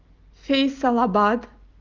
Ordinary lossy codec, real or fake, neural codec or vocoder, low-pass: Opus, 32 kbps; real; none; 7.2 kHz